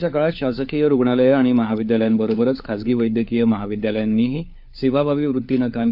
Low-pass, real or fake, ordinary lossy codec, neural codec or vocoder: 5.4 kHz; fake; AAC, 48 kbps; codec, 16 kHz, 4 kbps, FunCodec, trained on LibriTTS, 50 frames a second